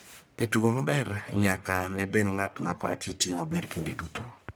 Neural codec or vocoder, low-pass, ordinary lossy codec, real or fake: codec, 44.1 kHz, 1.7 kbps, Pupu-Codec; none; none; fake